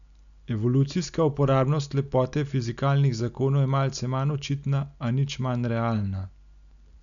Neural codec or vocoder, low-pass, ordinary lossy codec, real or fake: none; 7.2 kHz; none; real